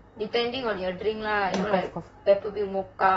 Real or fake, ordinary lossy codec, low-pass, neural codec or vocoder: fake; AAC, 24 kbps; 19.8 kHz; vocoder, 44.1 kHz, 128 mel bands, Pupu-Vocoder